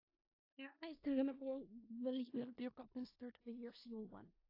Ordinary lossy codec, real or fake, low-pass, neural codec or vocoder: AAC, 32 kbps; fake; 5.4 kHz; codec, 16 kHz in and 24 kHz out, 0.4 kbps, LongCat-Audio-Codec, four codebook decoder